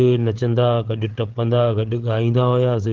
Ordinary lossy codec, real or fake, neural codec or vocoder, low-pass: Opus, 16 kbps; fake; codec, 16 kHz, 8 kbps, FreqCodec, larger model; 7.2 kHz